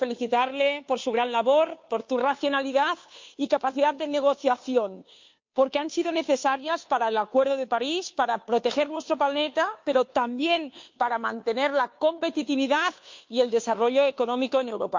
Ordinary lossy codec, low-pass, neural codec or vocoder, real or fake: MP3, 48 kbps; 7.2 kHz; codec, 16 kHz, 2 kbps, FunCodec, trained on Chinese and English, 25 frames a second; fake